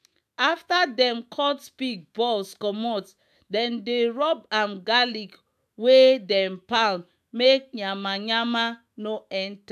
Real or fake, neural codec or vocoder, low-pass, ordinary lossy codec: fake; autoencoder, 48 kHz, 128 numbers a frame, DAC-VAE, trained on Japanese speech; 14.4 kHz; AAC, 96 kbps